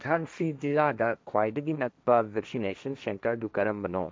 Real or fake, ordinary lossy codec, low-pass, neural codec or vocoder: fake; none; none; codec, 16 kHz, 1.1 kbps, Voila-Tokenizer